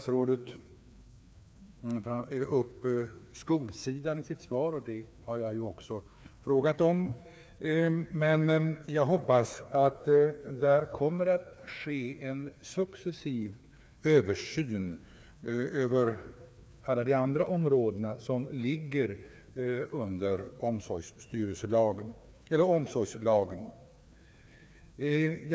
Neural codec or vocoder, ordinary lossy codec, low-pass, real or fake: codec, 16 kHz, 2 kbps, FreqCodec, larger model; none; none; fake